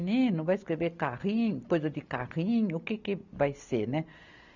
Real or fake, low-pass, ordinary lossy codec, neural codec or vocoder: real; 7.2 kHz; none; none